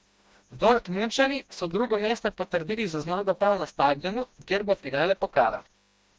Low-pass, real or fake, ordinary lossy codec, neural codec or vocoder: none; fake; none; codec, 16 kHz, 1 kbps, FreqCodec, smaller model